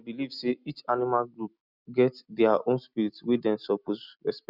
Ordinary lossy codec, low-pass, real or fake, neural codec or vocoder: none; 5.4 kHz; real; none